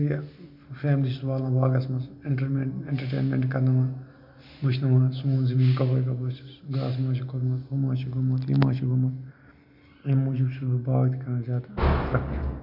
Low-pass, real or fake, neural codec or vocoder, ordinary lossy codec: 5.4 kHz; real; none; MP3, 48 kbps